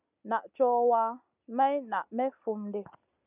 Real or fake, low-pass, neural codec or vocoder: real; 3.6 kHz; none